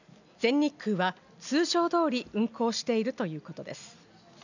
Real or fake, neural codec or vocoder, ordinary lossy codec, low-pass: real; none; none; 7.2 kHz